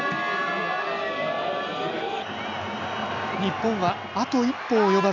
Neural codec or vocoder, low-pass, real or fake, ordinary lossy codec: none; 7.2 kHz; real; none